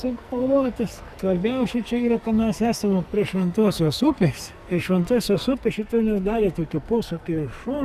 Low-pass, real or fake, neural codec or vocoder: 14.4 kHz; fake; codec, 44.1 kHz, 2.6 kbps, SNAC